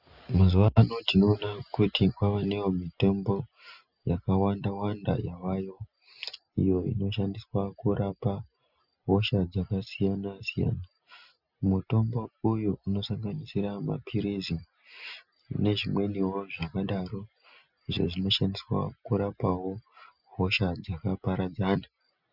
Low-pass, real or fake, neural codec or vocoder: 5.4 kHz; real; none